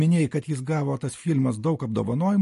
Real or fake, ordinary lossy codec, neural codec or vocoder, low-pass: real; MP3, 48 kbps; none; 14.4 kHz